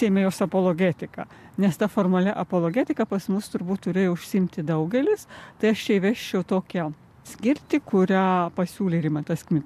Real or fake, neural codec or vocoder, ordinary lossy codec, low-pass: real; none; AAC, 96 kbps; 14.4 kHz